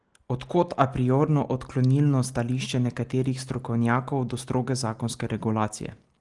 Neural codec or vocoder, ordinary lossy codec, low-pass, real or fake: none; Opus, 24 kbps; 10.8 kHz; real